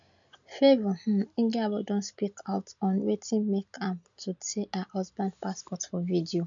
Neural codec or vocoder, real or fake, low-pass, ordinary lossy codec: none; real; 7.2 kHz; none